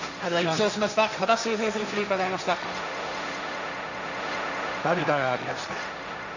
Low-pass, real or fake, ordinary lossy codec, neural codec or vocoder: 7.2 kHz; fake; none; codec, 16 kHz, 1.1 kbps, Voila-Tokenizer